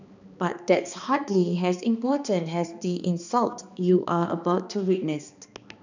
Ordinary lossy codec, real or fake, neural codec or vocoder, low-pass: none; fake; codec, 16 kHz, 2 kbps, X-Codec, HuBERT features, trained on balanced general audio; 7.2 kHz